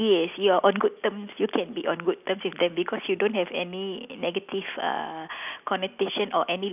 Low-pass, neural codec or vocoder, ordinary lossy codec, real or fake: 3.6 kHz; none; none; real